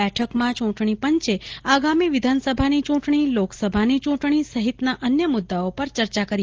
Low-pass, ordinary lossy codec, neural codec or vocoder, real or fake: 7.2 kHz; Opus, 16 kbps; none; real